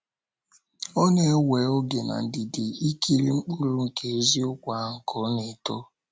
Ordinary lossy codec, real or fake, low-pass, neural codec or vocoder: none; real; none; none